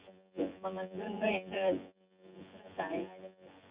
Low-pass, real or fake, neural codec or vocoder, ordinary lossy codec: 3.6 kHz; fake; vocoder, 24 kHz, 100 mel bands, Vocos; Opus, 64 kbps